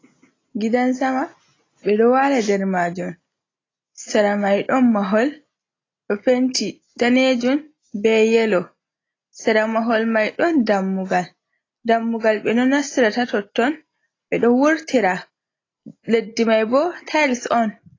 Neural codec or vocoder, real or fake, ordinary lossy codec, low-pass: none; real; AAC, 32 kbps; 7.2 kHz